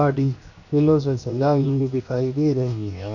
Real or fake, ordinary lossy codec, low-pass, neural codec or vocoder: fake; none; 7.2 kHz; codec, 16 kHz, 0.7 kbps, FocalCodec